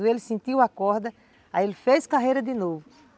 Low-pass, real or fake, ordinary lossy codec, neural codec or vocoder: none; real; none; none